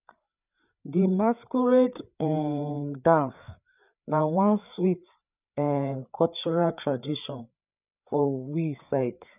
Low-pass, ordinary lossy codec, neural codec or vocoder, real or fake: 3.6 kHz; none; codec, 16 kHz, 4 kbps, FreqCodec, larger model; fake